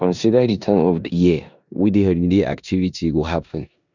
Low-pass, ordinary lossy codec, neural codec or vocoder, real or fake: 7.2 kHz; none; codec, 16 kHz in and 24 kHz out, 0.9 kbps, LongCat-Audio-Codec, four codebook decoder; fake